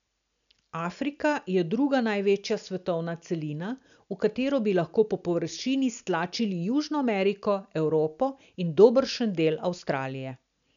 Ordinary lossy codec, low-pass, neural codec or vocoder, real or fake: none; 7.2 kHz; none; real